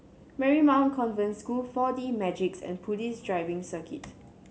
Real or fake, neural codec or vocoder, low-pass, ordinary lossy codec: real; none; none; none